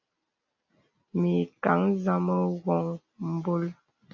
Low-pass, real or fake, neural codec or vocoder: 7.2 kHz; real; none